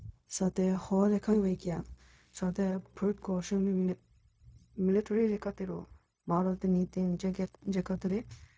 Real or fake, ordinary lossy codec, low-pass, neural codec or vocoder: fake; none; none; codec, 16 kHz, 0.4 kbps, LongCat-Audio-Codec